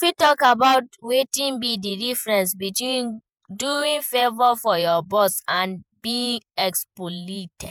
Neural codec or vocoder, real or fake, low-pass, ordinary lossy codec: vocoder, 48 kHz, 128 mel bands, Vocos; fake; none; none